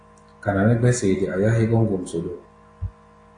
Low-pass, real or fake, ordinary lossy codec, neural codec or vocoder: 9.9 kHz; real; AAC, 48 kbps; none